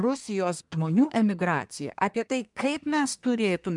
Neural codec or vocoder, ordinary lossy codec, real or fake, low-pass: codec, 24 kHz, 1 kbps, SNAC; MP3, 96 kbps; fake; 10.8 kHz